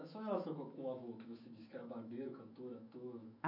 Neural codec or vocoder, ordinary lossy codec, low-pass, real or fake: autoencoder, 48 kHz, 128 numbers a frame, DAC-VAE, trained on Japanese speech; MP3, 48 kbps; 5.4 kHz; fake